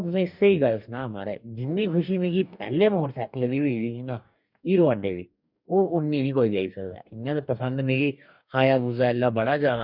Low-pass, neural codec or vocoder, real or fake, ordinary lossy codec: 5.4 kHz; codec, 44.1 kHz, 2.6 kbps, DAC; fake; none